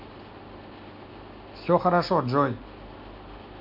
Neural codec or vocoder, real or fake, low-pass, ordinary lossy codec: none; real; 5.4 kHz; MP3, 48 kbps